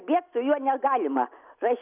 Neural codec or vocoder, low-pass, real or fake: none; 3.6 kHz; real